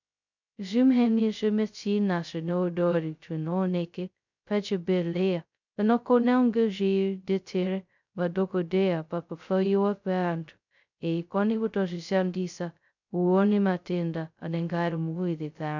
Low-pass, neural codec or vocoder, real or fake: 7.2 kHz; codec, 16 kHz, 0.2 kbps, FocalCodec; fake